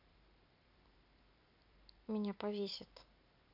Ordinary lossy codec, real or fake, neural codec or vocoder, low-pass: none; fake; vocoder, 44.1 kHz, 128 mel bands every 256 samples, BigVGAN v2; 5.4 kHz